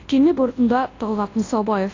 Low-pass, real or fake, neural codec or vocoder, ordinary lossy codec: 7.2 kHz; fake; codec, 24 kHz, 0.9 kbps, WavTokenizer, large speech release; AAC, 32 kbps